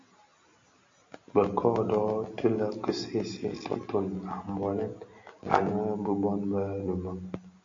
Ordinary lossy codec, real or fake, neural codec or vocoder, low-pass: MP3, 48 kbps; real; none; 7.2 kHz